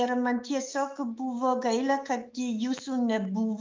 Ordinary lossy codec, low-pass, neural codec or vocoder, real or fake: Opus, 24 kbps; 7.2 kHz; autoencoder, 48 kHz, 128 numbers a frame, DAC-VAE, trained on Japanese speech; fake